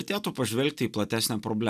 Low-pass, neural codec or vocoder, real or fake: 14.4 kHz; none; real